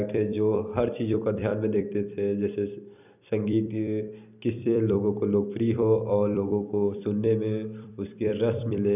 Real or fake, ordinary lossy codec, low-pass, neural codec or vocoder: fake; none; 3.6 kHz; vocoder, 44.1 kHz, 128 mel bands every 256 samples, BigVGAN v2